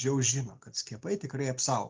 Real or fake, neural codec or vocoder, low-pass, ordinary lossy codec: real; none; 7.2 kHz; Opus, 32 kbps